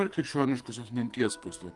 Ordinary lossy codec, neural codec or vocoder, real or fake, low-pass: Opus, 32 kbps; codec, 44.1 kHz, 2.6 kbps, SNAC; fake; 10.8 kHz